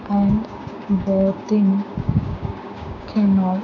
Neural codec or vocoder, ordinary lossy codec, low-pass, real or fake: codec, 16 kHz, 6 kbps, DAC; none; 7.2 kHz; fake